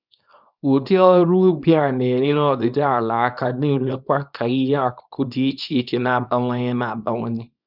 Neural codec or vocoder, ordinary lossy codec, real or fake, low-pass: codec, 24 kHz, 0.9 kbps, WavTokenizer, small release; none; fake; 5.4 kHz